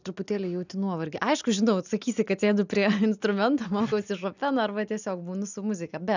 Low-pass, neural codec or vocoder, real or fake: 7.2 kHz; none; real